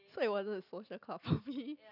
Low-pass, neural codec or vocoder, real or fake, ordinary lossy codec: 5.4 kHz; none; real; none